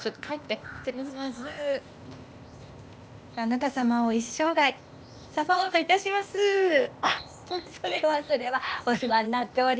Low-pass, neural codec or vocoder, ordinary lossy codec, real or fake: none; codec, 16 kHz, 0.8 kbps, ZipCodec; none; fake